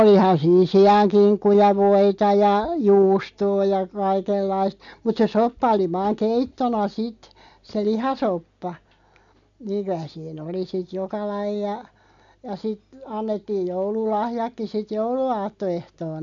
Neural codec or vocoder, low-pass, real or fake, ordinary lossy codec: none; 7.2 kHz; real; none